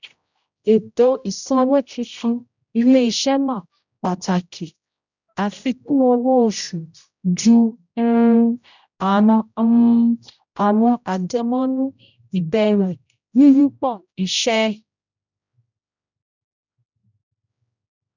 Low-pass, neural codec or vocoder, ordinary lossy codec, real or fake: 7.2 kHz; codec, 16 kHz, 0.5 kbps, X-Codec, HuBERT features, trained on general audio; none; fake